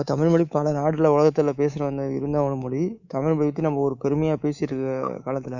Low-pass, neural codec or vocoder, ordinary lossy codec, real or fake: 7.2 kHz; codec, 24 kHz, 3.1 kbps, DualCodec; none; fake